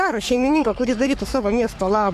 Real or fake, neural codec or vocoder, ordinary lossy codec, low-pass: fake; codec, 44.1 kHz, 3.4 kbps, Pupu-Codec; AAC, 96 kbps; 14.4 kHz